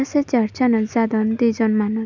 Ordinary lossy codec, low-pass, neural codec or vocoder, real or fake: none; 7.2 kHz; none; real